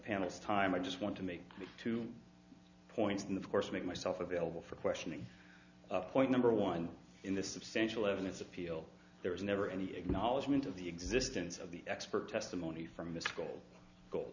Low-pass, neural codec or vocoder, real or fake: 7.2 kHz; none; real